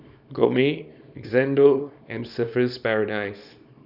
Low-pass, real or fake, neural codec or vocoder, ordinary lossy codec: 5.4 kHz; fake; codec, 24 kHz, 0.9 kbps, WavTokenizer, small release; none